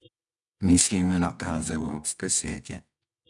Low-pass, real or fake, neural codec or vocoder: 10.8 kHz; fake; codec, 24 kHz, 0.9 kbps, WavTokenizer, medium music audio release